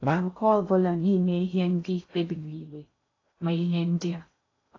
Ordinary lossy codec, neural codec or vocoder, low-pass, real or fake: AAC, 32 kbps; codec, 16 kHz in and 24 kHz out, 0.6 kbps, FocalCodec, streaming, 2048 codes; 7.2 kHz; fake